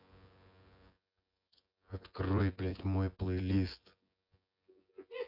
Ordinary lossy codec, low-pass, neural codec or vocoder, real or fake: AAC, 48 kbps; 5.4 kHz; vocoder, 24 kHz, 100 mel bands, Vocos; fake